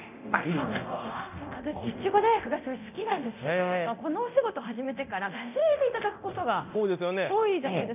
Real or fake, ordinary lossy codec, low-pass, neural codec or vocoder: fake; none; 3.6 kHz; codec, 24 kHz, 0.9 kbps, DualCodec